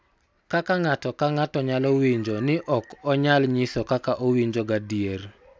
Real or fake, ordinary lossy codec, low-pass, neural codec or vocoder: real; none; none; none